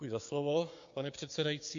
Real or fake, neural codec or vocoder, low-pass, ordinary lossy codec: fake; codec, 16 kHz, 6 kbps, DAC; 7.2 kHz; MP3, 48 kbps